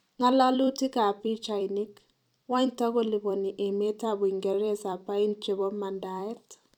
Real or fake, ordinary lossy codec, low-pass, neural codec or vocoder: fake; none; 19.8 kHz; vocoder, 44.1 kHz, 128 mel bands every 256 samples, BigVGAN v2